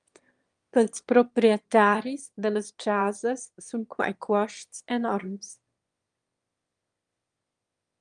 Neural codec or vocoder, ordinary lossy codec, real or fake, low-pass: autoencoder, 22.05 kHz, a latent of 192 numbers a frame, VITS, trained on one speaker; Opus, 32 kbps; fake; 9.9 kHz